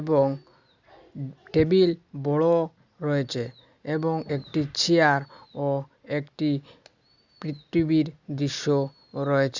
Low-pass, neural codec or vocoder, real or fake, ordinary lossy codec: 7.2 kHz; none; real; Opus, 64 kbps